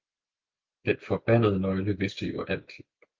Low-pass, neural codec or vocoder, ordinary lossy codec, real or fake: 7.2 kHz; none; Opus, 24 kbps; real